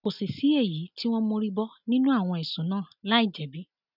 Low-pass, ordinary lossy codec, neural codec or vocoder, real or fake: 5.4 kHz; none; none; real